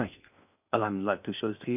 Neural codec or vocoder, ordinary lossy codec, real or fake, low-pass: codec, 16 kHz in and 24 kHz out, 0.6 kbps, FocalCodec, streaming, 4096 codes; none; fake; 3.6 kHz